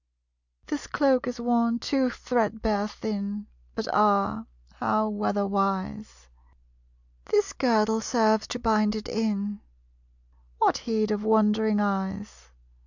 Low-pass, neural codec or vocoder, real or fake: 7.2 kHz; none; real